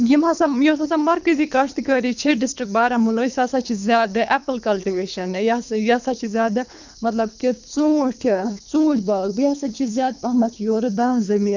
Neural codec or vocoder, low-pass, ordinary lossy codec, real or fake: codec, 24 kHz, 3 kbps, HILCodec; 7.2 kHz; none; fake